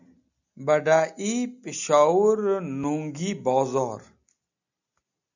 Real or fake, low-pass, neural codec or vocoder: real; 7.2 kHz; none